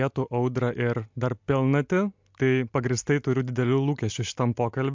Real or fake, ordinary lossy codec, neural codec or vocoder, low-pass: real; MP3, 64 kbps; none; 7.2 kHz